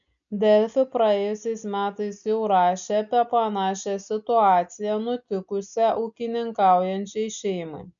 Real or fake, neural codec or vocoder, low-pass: real; none; 7.2 kHz